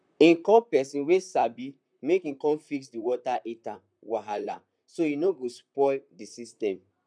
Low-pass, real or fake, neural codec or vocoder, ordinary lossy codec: 9.9 kHz; fake; vocoder, 44.1 kHz, 128 mel bands, Pupu-Vocoder; none